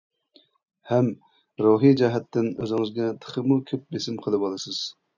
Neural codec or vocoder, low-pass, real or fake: none; 7.2 kHz; real